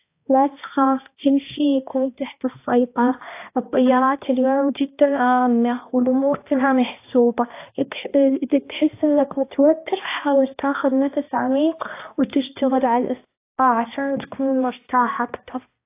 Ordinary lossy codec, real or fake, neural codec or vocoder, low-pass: AAC, 24 kbps; fake; codec, 16 kHz, 1 kbps, X-Codec, HuBERT features, trained on balanced general audio; 3.6 kHz